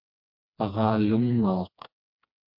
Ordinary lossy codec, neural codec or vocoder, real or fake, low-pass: MP3, 48 kbps; codec, 16 kHz, 2 kbps, FreqCodec, smaller model; fake; 5.4 kHz